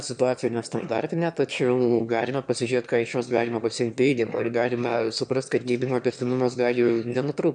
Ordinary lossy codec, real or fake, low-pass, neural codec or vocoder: MP3, 96 kbps; fake; 9.9 kHz; autoencoder, 22.05 kHz, a latent of 192 numbers a frame, VITS, trained on one speaker